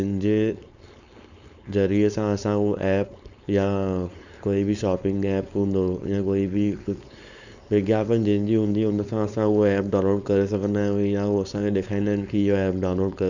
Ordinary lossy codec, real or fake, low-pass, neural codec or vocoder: none; fake; 7.2 kHz; codec, 16 kHz, 4.8 kbps, FACodec